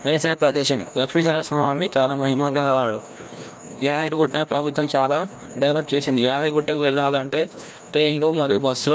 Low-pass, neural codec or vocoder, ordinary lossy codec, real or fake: none; codec, 16 kHz, 1 kbps, FreqCodec, larger model; none; fake